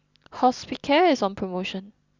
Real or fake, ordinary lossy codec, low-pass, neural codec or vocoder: real; Opus, 64 kbps; 7.2 kHz; none